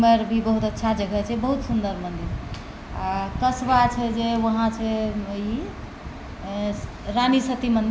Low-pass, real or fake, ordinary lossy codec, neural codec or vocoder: none; real; none; none